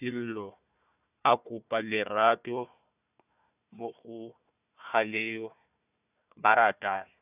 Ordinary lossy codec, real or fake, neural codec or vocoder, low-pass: none; fake; codec, 16 kHz, 1 kbps, FunCodec, trained on Chinese and English, 50 frames a second; 3.6 kHz